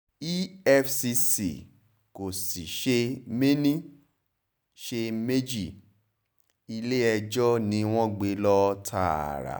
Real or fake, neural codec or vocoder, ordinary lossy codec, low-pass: real; none; none; none